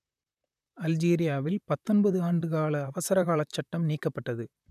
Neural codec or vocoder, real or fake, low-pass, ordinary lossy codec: vocoder, 44.1 kHz, 128 mel bands, Pupu-Vocoder; fake; 14.4 kHz; none